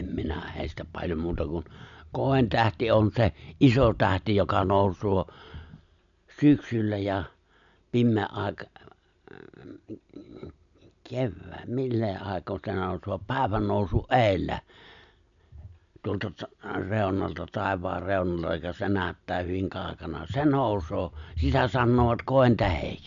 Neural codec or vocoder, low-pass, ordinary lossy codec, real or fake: none; 7.2 kHz; none; real